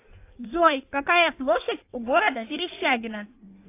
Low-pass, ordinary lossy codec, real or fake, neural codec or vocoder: 3.6 kHz; AAC, 24 kbps; fake; codec, 16 kHz in and 24 kHz out, 1.1 kbps, FireRedTTS-2 codec